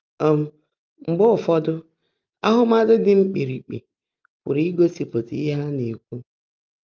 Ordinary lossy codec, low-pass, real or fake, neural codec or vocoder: Opus, 24 kbps; 7.2 kHz; real; none